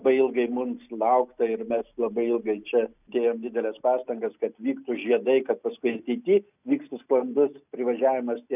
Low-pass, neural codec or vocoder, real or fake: 3.6 kHz; none; real